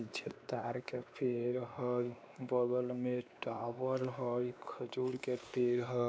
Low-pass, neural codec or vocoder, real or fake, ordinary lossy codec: none; codec, 16 kHz, 2 kbps, X-Codec, WavLM features, trained on Multilingual LibriSpeech; fake; none